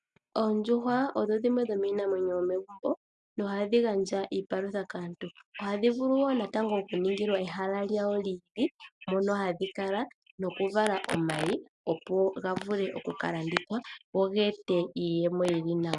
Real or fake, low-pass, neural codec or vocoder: real; 10.8 kHz; none